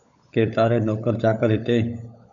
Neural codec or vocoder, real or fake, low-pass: codec, 16 kHz, 16 kbps, FunCodec, trained on LibriTTS, 50 frames a second; fake; 7.2 kHz